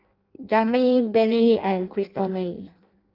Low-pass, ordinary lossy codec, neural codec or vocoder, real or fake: 5.4 kHz; Opus, 24 kbps; codec, 16 kHz in and 24 kHz out, 0.6 kbps, FireRedTTS-2 codec; fake